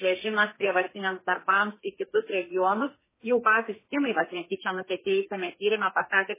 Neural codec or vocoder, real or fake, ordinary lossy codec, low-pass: codec, 32 kHz, 1.9 kbps, SNAC; fake; MP3, 16 kbps; 3.6 kHz